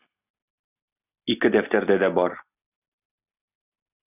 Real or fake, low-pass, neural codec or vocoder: real; 3.6 kHz; none